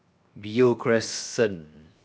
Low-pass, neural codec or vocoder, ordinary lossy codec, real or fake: none; codec, 16 kHz, 0.7 kbps, FocalCodec; none; fake